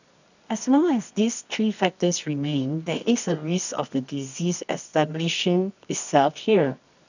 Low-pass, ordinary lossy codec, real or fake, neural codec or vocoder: 7.2 kHz; none; fake; codec, 24 kHz, 0.9 kbps, WavTokenizer, medium music audio release